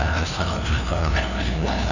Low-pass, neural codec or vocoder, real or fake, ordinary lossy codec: 7.2 kHz; codec, 16 kHz, 0.5 kbps, FunCodec, trained on LibriTTS, 25 frames a second; fake; none